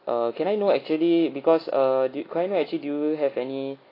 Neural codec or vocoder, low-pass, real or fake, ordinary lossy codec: none; 5.4 kHz; real; AAC, 24 kbps